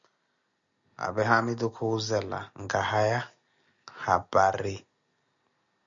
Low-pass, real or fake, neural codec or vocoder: 7.2 kHz; real; none